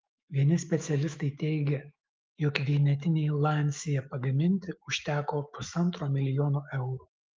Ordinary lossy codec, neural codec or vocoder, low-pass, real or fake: Opus, 24 kbps; autoencoder, 48 kHz, 128 numbers a frame, DAC-VAE, trained on Japanese speech; 7.2 kHz; fake